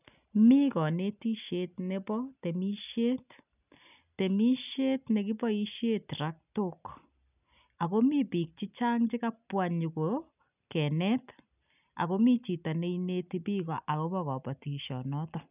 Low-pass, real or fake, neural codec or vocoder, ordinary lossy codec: 3.6 kHz; real; none; none